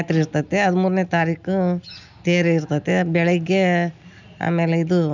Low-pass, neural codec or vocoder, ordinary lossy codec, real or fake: 7.2 kHz; none; none; real